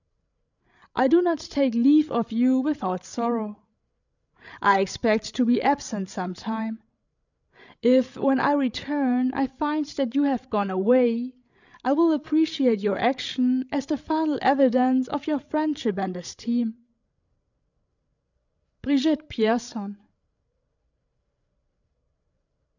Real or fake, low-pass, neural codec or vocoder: fake; 7.2 kHz; codec, 16 kHz, 16 kbps, FreqCodec, larger model